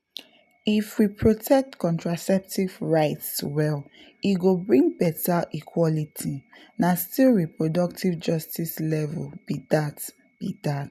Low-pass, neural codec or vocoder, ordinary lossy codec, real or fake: 14.4 kHz; none; none; real